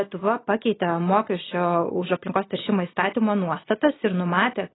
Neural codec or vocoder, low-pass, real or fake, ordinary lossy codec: none; 7.2 kHz; real; AAC, 16 kbps